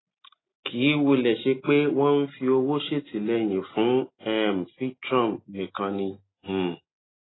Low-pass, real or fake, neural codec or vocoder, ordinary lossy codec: 7.2 kHz; real; none; AAC, 16 kbps